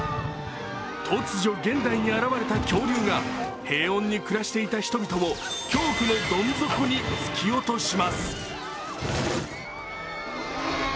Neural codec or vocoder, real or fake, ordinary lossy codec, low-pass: none; real; none; none